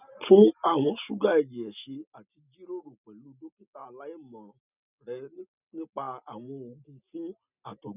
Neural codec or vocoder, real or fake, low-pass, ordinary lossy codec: none; real; 3.6 kHz; none